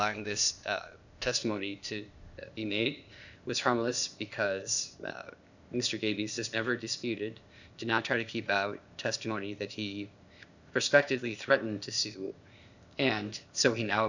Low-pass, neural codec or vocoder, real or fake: 7.2 kHz; codec, 16 kHz, 0.8 kbps, ZipCodec; fake